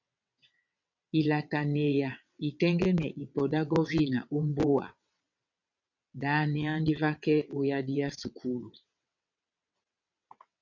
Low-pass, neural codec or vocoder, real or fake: 7.2 kHz; vocoder, 22.05 kHz, 80 mel bands, WaveNeXt; fake